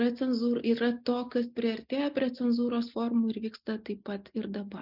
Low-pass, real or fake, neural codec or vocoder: 5.4 kHz; real; none